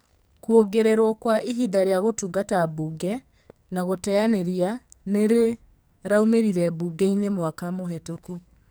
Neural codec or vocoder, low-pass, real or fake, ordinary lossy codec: codec, 44.1 kHz, 2.6 kbps, SNAC; none; fake; none